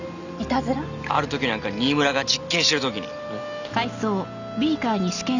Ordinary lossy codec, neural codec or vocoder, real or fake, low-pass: none; none; real; 7.2 kHz